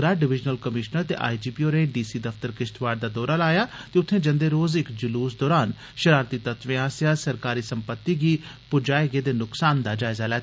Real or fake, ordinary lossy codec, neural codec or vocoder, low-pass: real; none; none; none